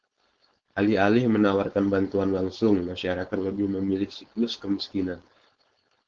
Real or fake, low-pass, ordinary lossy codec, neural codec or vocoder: fake; 7.2 kHz; Opus, 16 kbps; codec, 16 kHz, 4.8 kbps, FACodec